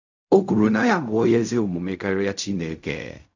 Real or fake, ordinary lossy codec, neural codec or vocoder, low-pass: fake; none; codec, 16 kHz in and 24 kHz out, 0.4 kbps, LongCat-Audio-Codec, fine tuned four codebook decoder; 7.2 kHz